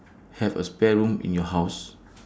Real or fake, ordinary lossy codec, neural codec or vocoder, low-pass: real; none; none; none